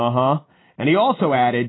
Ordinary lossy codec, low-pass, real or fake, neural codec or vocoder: AAC, 16 kbps; 7.2 kHz; real; none